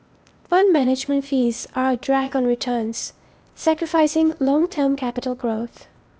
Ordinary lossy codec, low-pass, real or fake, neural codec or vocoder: none; none; fake; codec, 16 kHz, 0.8 kbps, ZipCodec